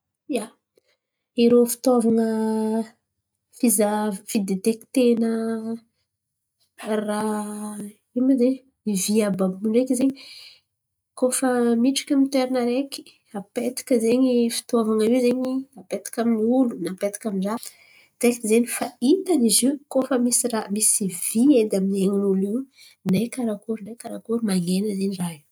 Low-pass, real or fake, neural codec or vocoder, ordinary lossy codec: none; real; none; none